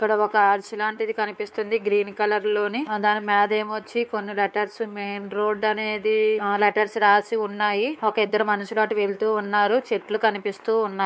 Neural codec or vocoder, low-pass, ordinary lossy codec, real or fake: codec, 16 kHz, 4 kbps, X-Codec, WavLM features, trained on Multilingual LibriSpeech; none; none; fake